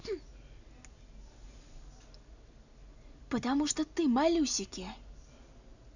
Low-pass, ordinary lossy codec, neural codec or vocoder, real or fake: 7.2 kHz; none; none; real